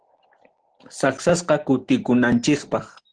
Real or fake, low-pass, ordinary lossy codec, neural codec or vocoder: real; 9.9 kHz; Opus, 16 kbps; none